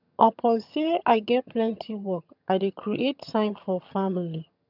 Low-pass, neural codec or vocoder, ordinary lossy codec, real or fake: 5.4 kHz; vocoder, 22.05 kHz, 80 mel bands, HiFi-GAN; none; fake